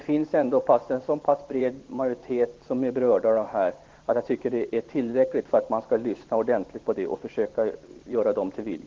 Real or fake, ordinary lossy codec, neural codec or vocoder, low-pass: fake; Opus, 16 kbps; vocoder, 44.1 kHz, 128 mel bands every 512 samples, BigVGAN v2; 7.2 kHz